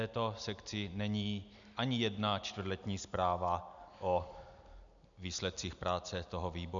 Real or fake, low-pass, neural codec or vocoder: real; 7.2 kHz; none